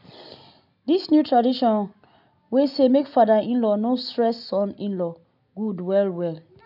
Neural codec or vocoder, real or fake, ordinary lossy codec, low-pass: none; real; none; 5.4 kHz